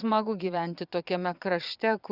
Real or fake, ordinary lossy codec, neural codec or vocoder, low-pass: fake; Opus, 64 kbps; vocoder, 22.05 kHz, 80 mel bands, Vocos; 5.4 kHz